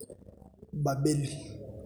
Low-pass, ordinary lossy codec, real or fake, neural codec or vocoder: none; none; real; none